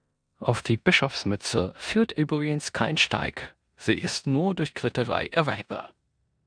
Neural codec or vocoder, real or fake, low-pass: codec, 16 kHz in and 24 kHz out, 0.9 kbps, LongCat-Audio-Codec, four codebook decoder; fake; 9.9 kHz